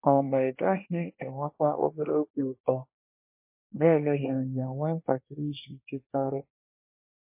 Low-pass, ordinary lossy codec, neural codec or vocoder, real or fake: 3.6 kHz; MP3, 24 kbps; codec, 24 kHz, 1 kbps, SNAC; fake